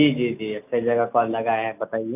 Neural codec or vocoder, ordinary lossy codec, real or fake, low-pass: none; none; real; 3.6 kHz